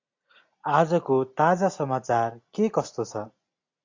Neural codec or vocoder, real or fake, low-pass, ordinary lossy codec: none; real; 7.2 kHz; AAC, 48 kbps